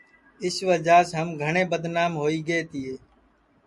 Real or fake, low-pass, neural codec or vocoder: real; 10.8 kHz; none